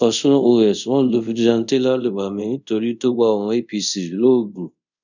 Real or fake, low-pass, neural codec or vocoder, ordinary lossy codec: fake; 7.2 kHz; codec, 24 kHz, 0.5 kbps, DualCodec; none